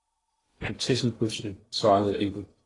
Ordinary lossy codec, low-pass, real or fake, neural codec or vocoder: AAC, 32 kbps; 10.8 kHz; fake; codec, 16 kHz in and 24 kHz out, 0.8 kbps, FocalCodec, streaming, 65536 codes